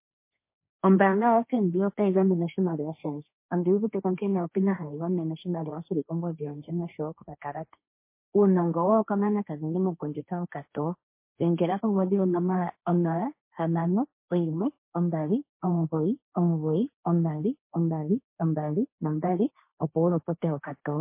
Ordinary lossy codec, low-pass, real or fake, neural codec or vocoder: MP3, 24 kbps; 3.6 kHz; fake; codec, 16 kHz, 1.1 kbps, Voila-Tokenizer